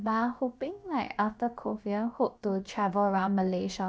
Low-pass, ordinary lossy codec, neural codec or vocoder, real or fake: none; none; codec, 16 kHz, about 1 kbps, DyCAST, with the encoder's durations; fake